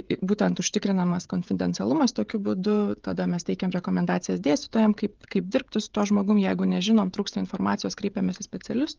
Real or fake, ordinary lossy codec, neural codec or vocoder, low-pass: fake; Opus, 24 kbps; codec, 16 kHz, 16 kbps, FreqCodec, smaller model; 7.2 kHz